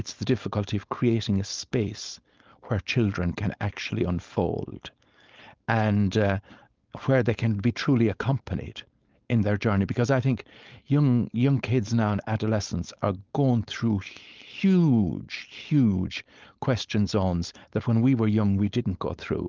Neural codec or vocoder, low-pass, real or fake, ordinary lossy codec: codec, 16 kHz, 4.8 kbps, FACodec; 7.2 kHz; fake; Opus, 32 kbps